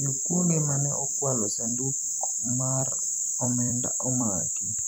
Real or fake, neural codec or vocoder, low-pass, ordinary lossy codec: fake; vocoder, 44.1 kHz, 128 mel bands every 256 samples, BigVGAN v2; none; none